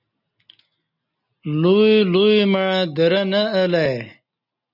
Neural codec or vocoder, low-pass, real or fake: none; 5.4 kHz; real